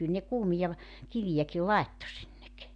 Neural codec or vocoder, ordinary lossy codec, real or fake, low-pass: none; none; real; 9.9 kHz